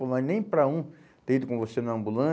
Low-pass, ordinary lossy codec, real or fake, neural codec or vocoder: none; none; real; none